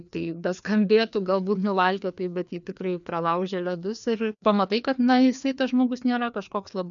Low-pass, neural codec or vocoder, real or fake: 7.2 kHz; codec, 16 kHz, 2 kbps, FreqCodec, larger model; fake